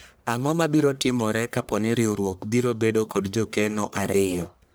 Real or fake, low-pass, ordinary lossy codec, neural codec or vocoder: fake; none; none; codec, 44.1 kHz, 1.7 kbps, Pupu-Codec